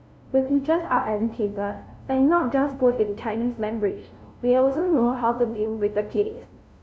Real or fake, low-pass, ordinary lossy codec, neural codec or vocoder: fake; none; none; codec, 16 kHz, 0.5 kbps, FunCodec, trained on LibriTTS, 25 frames a second